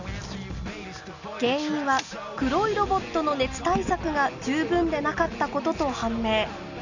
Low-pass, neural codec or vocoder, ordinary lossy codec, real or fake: 7.2 kHz; none; none; real